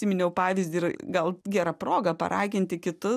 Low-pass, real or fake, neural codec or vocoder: 14.4 kHz; real; none